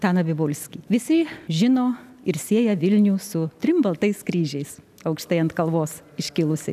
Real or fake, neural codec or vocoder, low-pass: real; none; 14.4 kHz